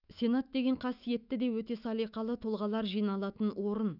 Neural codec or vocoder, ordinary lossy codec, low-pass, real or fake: autoencoder, 48 kHz, 128 numbers a frame, DAC-VAE, trained on Japanese speech; none; 5.4 kHz; fake